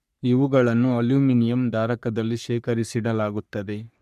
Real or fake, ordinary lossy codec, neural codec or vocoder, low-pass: fake; none; codec, 44.1 kHz, 3.4 kbps, Pupu-Codec; 14.4 kHz